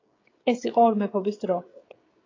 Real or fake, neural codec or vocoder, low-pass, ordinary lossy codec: fake; codec, 16 kHz, 16 kbps, FreqCodec, smaller model; 7.2 kHz; AAC, 32 kbps